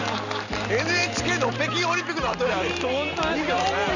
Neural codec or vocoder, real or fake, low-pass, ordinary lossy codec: none; real; 7.2 kHz; none